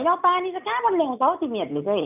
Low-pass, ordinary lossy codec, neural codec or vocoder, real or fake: 3.6 kHz; none; none; real